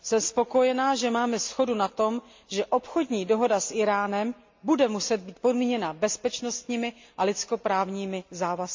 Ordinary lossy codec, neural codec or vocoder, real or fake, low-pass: MP3, 48 kbps; none; real; 7.2 kHz